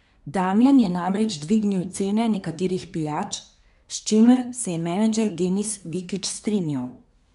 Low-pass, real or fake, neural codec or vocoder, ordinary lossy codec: 10.8 kHz; fake; codec, 24 kHz, 1 kbps, SNAC; none